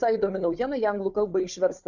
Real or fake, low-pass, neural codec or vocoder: fake; 7.2 kHz; codec, 16 kHz, 4 kbps, FunCodec, trained on Chinese and English, 50 frames a second